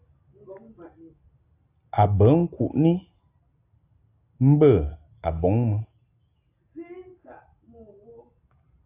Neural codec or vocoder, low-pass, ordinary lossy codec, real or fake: none; 3.6 kHz; AAC, 24 kbps; real